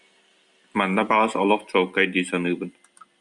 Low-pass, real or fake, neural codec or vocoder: 10.8 kHz; real; none